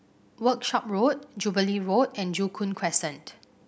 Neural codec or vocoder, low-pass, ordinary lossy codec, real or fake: none; none; none; real